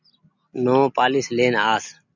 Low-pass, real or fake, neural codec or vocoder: 7.2 kHz; real; none